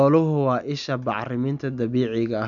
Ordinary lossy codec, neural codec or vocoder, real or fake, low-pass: none; none; real; 7.2 kHz